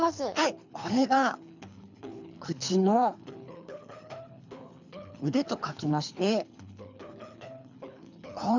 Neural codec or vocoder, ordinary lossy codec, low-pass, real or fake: codec, 24 kHz, 3 kbps, HILCodec; none; 7.2 kHz; fake